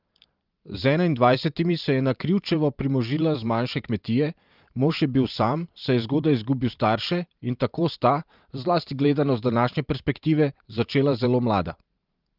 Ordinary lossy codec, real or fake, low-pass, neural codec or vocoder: Opus, 24 kbps; fake; 5.4 kHz; vocoder, 44.1 kHz, 128 mel bands every 512 samples, BigVGAN v2